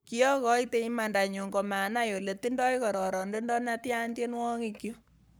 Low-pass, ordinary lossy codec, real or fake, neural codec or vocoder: none; none; fake; codec, 44.1 kHz, 7.8 kbps, Pupu-Codec